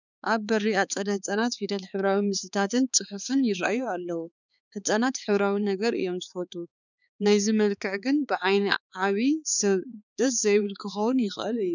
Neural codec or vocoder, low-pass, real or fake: codec, 16 kHz, 4 kbps, X-Codec, HuBERT features, trained on balanced general audio; 7.2 kHz; fake